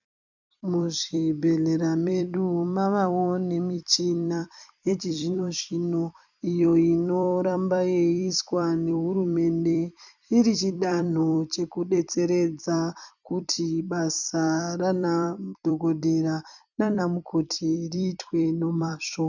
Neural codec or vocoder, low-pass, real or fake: vocoder, 44.1 kHz, 128 mel bands every 256 samples, BigVGAN v2; 7.2 kHz; fake